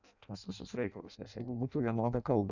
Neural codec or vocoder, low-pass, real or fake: codec, 16 kHz in and 24 kHz out, 0.6 kbps, FireRedTTS-2 codec; 7.2 kHz; fake